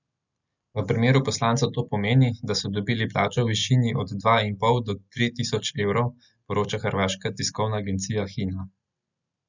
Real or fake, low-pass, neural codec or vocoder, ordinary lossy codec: real; 7.2 kHz; none; none